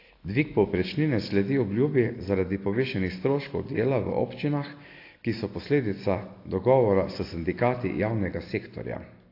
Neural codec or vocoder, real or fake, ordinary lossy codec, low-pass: none; real; AAC, 32 kbps; 5.4 kHz